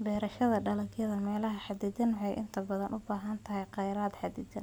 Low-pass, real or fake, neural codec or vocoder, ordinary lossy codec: none; real; none; none